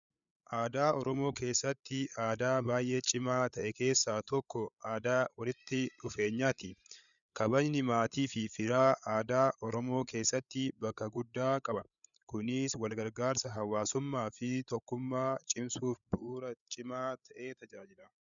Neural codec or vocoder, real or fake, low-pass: codec, 16 kHz, 8 kbps, FreqCodec, larger model; fake; 7.2 kHz